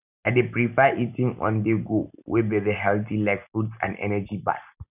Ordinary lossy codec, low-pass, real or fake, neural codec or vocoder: none; 3.6 kHz; real; none